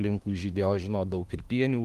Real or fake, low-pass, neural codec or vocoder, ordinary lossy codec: fake; 14.4 kHz; autoencoder, 48 kHz, 32 numbers a frame, DAC-VAE, trained on Japanese speech; Opus, 24 kbps